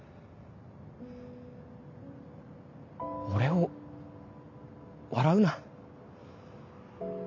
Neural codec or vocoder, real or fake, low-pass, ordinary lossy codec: none; real; 7.2 kHz; MP3, 48 kbps